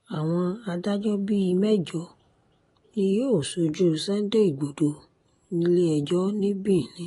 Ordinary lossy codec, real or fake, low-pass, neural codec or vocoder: AAC, 32 kbps; real; 10.8 kHz; none